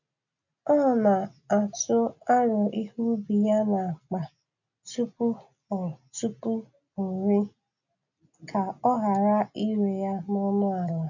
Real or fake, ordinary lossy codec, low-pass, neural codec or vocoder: real; none; 7.2 kHz; none